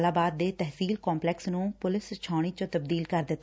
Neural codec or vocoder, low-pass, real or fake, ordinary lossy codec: none; none; real; none